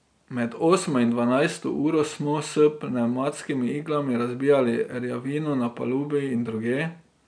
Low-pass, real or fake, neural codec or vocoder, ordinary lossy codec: 9.9 kHz; real; none; none